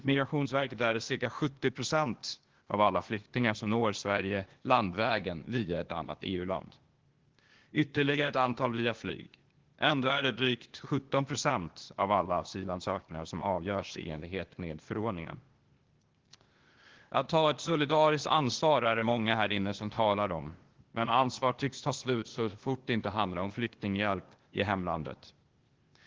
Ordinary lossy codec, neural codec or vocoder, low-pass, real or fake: Opus, 16 kbps; codec, 16 kHz, 0.8 kbps, ZipCodec; 7.2 kHz; fake